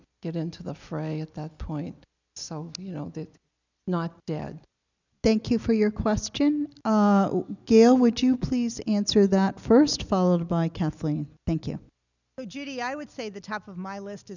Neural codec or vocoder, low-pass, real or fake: none; 7.2 kHz; real